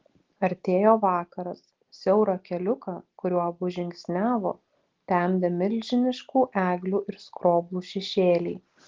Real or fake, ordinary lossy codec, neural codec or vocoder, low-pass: real; Opus, 16 kbps; none; 7.2 kHz